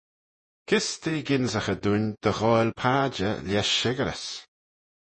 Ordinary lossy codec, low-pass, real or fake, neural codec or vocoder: MP3, 32 kbps; 10.8 kHz; fake; vocoder, 48 kHz, 128 mel bands, Vocos